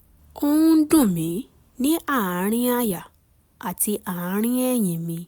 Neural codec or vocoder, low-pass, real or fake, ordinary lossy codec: none; none; real; none